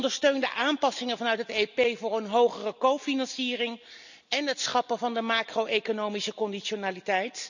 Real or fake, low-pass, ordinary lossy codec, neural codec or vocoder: real; 7.2 kHz; none; none